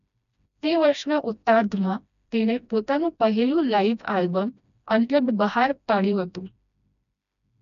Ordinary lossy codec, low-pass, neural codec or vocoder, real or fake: none; 7.2 kHz; codec, 16 kHz, 1 kbps, FreqCodec, smaller model; fake